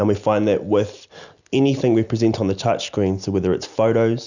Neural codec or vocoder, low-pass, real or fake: none; 7.2 kHz; real